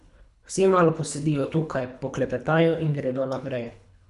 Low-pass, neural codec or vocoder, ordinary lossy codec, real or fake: 10.8 kHz; codec, 24 kHz, 3 kbps, HILCodec; none; fake